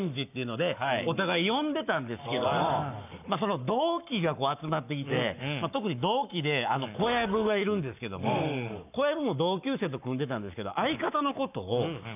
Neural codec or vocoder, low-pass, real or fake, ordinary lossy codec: codec, 44.1 kHz, 7.8 kbps, Pupu-Codec; 3.6 kHz; fake; none